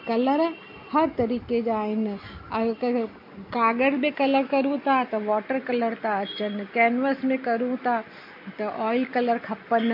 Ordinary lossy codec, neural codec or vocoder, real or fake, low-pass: MP3, 32 kbps; none; real; 5.4 kHz